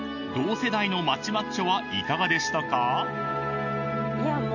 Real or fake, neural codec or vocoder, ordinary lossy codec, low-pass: real; none; none; 7.2 kHz